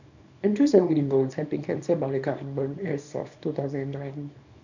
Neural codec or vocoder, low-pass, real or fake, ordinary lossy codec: codec, 24 kHz, 0.9 kbps, WavTokenizer, small release; 7.2 kHz; fake; none